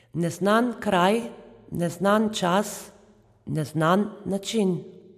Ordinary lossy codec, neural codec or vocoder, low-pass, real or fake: AAC, 96 kbps; none; 14.4 kHz; real